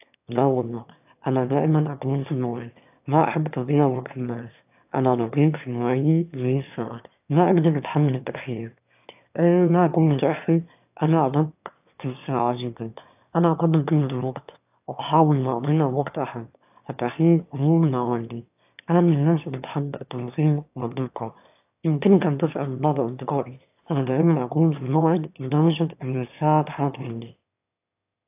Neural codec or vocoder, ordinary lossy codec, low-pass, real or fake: autoencoder, 22.05 kHz, a latent of 192 numbers a frame, VITS, trained on one speaker; AAC, 32 kbps; 3.6 kHz; fake